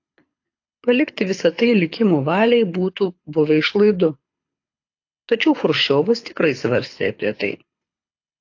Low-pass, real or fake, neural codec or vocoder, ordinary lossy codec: 7.2 kHz; fake; codec, 24 kHz, 6 kbps, HILCodec; AAC, 48 kbps